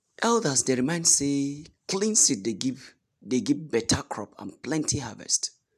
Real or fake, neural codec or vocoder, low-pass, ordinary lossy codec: real; none; 14.4 kHz; none